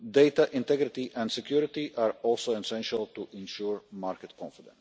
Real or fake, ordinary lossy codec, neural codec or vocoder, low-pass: real; none; none; none